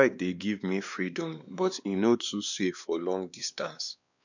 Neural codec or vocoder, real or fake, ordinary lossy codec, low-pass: codec, 16 kHz, 2 kbps, X-Codec, WavLM features, trained on Multilingual LibriSpeech; fake; none; 7.2 kHz